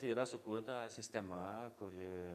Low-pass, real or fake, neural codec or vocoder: 14.4 kHz; fake; codec, 44.1 kHz, 2.6 kbps, SNAC